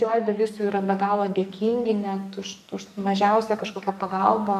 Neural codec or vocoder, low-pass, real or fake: codec, 44.1 kHz, 2.6 kbps, SNAC; 14.4 kHz; fake